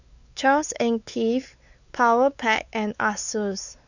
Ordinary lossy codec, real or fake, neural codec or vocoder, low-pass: none; fake; codec, 16 kHz, 4 kbps, X-Codec, WavLM features, trained on Multilingual LibriSpeech; 7.2 kHz